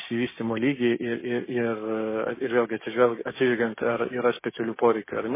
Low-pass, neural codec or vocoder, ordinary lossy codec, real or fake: 3.6 kHz; codec, 16 kHz, 6 kbps, DAC; MP3, 16 kbps; fake